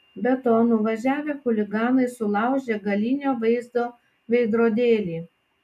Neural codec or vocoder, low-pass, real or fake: none; 14.4 kHz; real